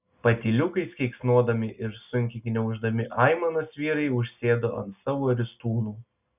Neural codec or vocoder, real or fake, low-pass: none; real; 3.6 kHz